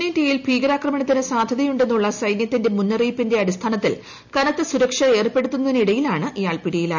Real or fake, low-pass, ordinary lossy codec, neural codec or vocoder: real; 7.2 kHz; none; none